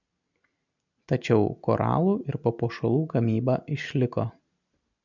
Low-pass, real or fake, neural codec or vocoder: 7.2 kHz; real; none